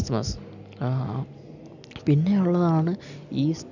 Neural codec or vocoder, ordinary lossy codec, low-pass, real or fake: none; none; 7.2 kHz; real